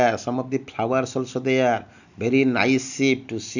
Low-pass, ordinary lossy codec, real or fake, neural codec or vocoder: 7.2 kHz; none; real; none